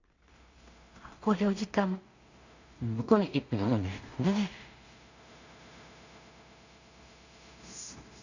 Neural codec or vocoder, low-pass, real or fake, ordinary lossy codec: codec, 16 kHz in and 24 kHz out, 0.4 kbps, LongCat-Audio-Codec, two codebook decoder; 7.2 kHz; fake; none